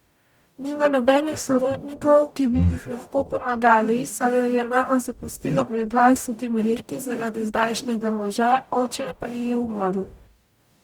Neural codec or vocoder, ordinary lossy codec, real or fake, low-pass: codec, 44.1 kHz, 0.9 kbps, DAC; Opus, 64 kbps; fake; 19.8 kHz